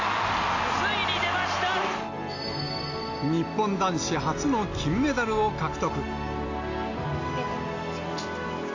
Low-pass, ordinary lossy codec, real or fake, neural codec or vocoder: 7.2 kHz; AAC, 48 kbps; real; none